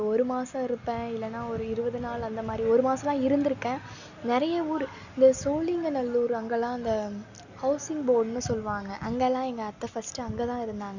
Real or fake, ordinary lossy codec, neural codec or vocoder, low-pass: real; none; none; 7.2 kHz